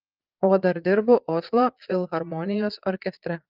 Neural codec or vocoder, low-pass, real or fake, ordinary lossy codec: vocoder, 22.05 kHz, 80 mel bands, Vocos; 5.4 kHz; fake; Opus, 32 kbps